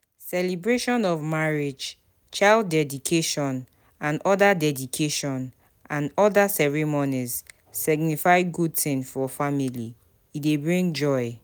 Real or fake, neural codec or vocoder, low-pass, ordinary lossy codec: real; none; none; none